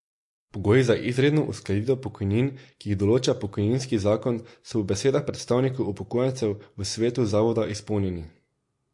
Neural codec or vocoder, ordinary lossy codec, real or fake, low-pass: none; MP3, 48 kbps; real; 10.8 kHz